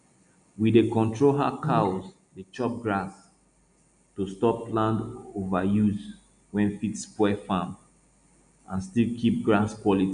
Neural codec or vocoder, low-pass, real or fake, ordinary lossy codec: none; 9.9 kHz; real; none